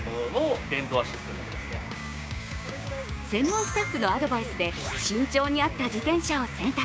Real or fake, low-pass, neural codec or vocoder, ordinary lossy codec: fake; none; codec, 16 kHz, 6 kbps, DAC; none